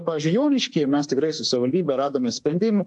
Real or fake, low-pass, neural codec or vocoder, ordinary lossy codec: fake; 10.8 kHz; autoencoder, 48 kHz, 32 numbers a frame, DAC-VAE, trained on Japanese speech; AAC, 64 kbps